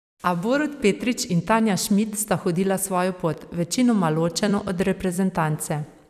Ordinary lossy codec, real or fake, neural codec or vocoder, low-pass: none; fake; vocoder, 44.1 kHz, 128 mel bands every 256 samples, BigVGAN v2; 14.4 kHz